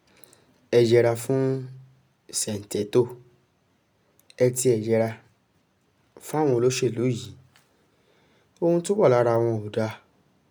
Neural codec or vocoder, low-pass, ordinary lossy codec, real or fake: none; none; none; real